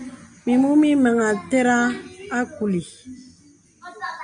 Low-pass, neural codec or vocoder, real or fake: 9.9 kHz; none; real